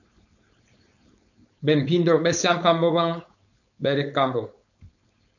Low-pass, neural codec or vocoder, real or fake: 7.2 kHz; codec, 16 kHz, 4.8 kbps, FACodec; fake